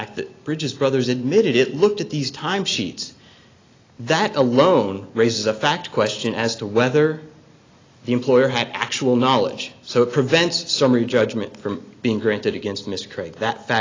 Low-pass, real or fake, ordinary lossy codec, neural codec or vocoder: 7.2 kHz; real; AAC, 32 kbps; none